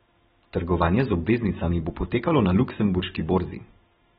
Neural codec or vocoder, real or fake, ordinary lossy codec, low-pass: none; real; AAC, 16 kbps; 10.8 kHz